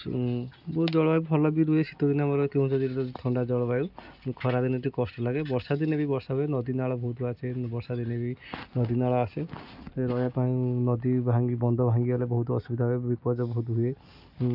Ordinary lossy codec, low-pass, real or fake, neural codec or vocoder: none; 5.4 kHz; real; none